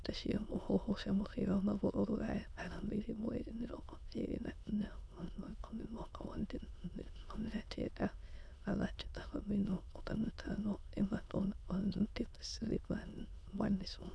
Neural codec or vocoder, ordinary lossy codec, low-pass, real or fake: autoencoder, 22.05 kHz, a latent of 192 numbers a frame, VITS, trained on many speakers; none; none; fake